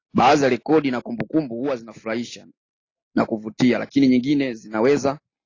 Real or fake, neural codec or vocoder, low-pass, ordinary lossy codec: real; none; 7.2 kHz; AAC, 32 kbps